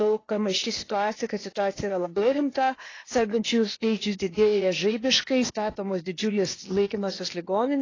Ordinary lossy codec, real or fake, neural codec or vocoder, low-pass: AAC, 32 kbps; fake; codec, 16 kHz, 0.8 kbps, ZipCodec; 7.2 kHz